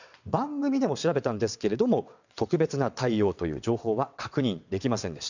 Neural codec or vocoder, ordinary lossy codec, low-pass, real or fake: vocoder, 22.05 kHz, 80 mel bands, WaveNeXt; none; 7.2 kHz; fake